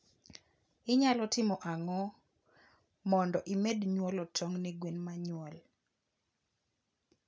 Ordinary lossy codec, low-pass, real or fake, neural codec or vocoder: none; none; real; none